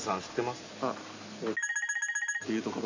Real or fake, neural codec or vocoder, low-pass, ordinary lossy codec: real; none; 7.2 kHz; AAC, 48 kbps